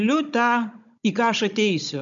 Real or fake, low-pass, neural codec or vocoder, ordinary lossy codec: fake; 7.2 kHz; codec, 16 kHz, 16 kbps, FunCodec, trained on Chinese and English, 50 frames a second; MP3, 96 kbps